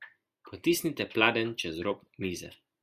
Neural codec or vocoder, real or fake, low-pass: none; real; 14.4 kHz